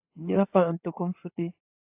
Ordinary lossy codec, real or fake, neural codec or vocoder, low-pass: AAC, 32 kbps; fake; codec, 16 kHz in and 24 kHz out, 2.2 kbps, FireRedTTS-2 codec; 3.6 kHz